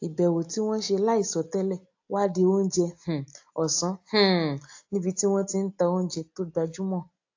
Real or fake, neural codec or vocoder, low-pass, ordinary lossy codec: real; none; 7.2 kHz; AAC, 48 kbps